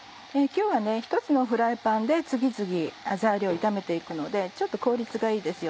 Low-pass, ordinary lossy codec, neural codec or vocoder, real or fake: none; none; none; real